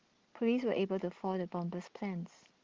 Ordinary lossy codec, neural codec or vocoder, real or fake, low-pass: Opus, 16 kbps; none; real; 7.2 kHz